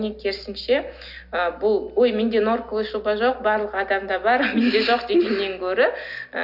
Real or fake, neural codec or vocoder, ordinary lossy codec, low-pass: real; none; none; 5.4 kHz